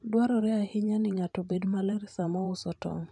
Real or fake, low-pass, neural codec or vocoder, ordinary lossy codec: fake; 10.8 kHz; vocoder, 44.1 kHz, 128 mel bands every 512 samples, BigVGAN v2; none